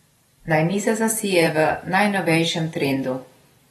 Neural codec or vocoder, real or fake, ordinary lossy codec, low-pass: vocoder, 44.1 kHz, 128 mel bands every 512 samples, BigVGAN v2; fake; AAC, 32 kbps; 19.8 kHz